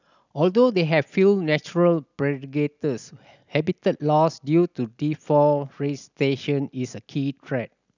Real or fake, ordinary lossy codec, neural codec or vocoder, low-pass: real; none; none; 7.2 kHz